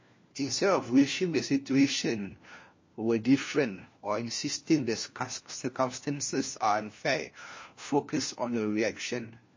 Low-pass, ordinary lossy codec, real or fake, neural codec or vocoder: 7.2 kHz; MP3, 32 kbps; fake; codec, 16 kHz, 1 kbps, FunCodec, trained on LibriTTS, 50 frames a second